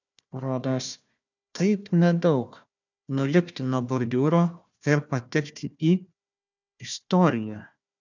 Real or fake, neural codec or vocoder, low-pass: fake; codec, 16 kHz, 1 kbps, FunCodec, trained on Chinese and English, 50 frames a second; 7.2 kHz